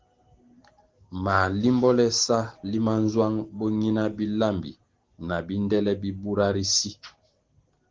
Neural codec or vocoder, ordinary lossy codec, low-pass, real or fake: none; Opus, 16 kbps; 7.2 kHz; real